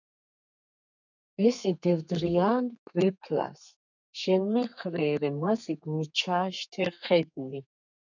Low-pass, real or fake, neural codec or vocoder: 7.2 kHz; fake; codec, 32 kHz, 1.9 kbps, SNAC